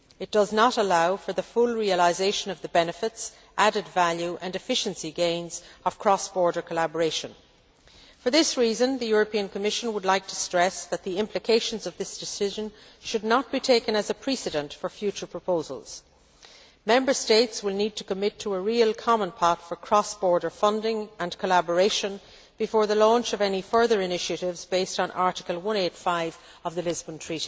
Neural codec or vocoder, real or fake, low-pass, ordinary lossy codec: none; real; none; none